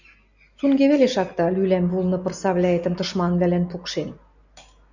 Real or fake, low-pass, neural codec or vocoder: real; 7.2 kHz; none